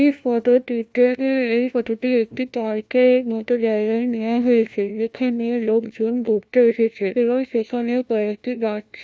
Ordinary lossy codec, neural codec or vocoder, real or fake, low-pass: none; codec, 16 kHz, 1 kbps, FunCodec, trained on LibriTTS, 50 frames a second; fake; none